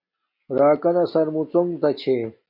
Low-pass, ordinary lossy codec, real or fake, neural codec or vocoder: 5.4 kHz; MP3, 32 kbps; real; none